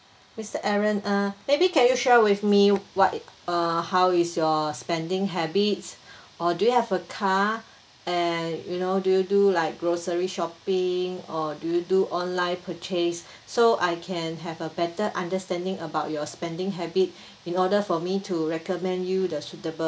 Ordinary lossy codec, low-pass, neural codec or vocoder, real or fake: none; none; none; real